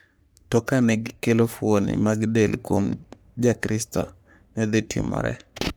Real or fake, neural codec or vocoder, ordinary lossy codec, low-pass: fake; codec, 44.1 kHz, 3.4 kbps, Pupu-Codec; none; none